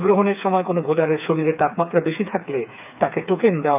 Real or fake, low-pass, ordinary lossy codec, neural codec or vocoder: fake; 3.6 kHz; none; codec, 16 kHz, 4 kbps, FreqCodec, smaller model